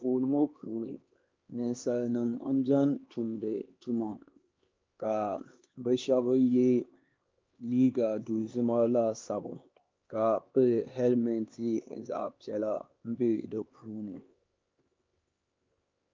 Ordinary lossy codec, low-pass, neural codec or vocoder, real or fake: Opus, 32 kbps; 7.2 kHz; codec, 16 kHz, 2 kbps, X-Codec, HuBERT features, trained on LibriSpeech; fake